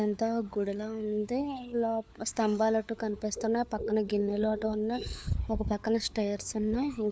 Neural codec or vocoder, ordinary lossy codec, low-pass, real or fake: codec, 16 kHz, 4 kbps, FunCodec, trained on LibriTTS, 50 frames a second; none; none; fake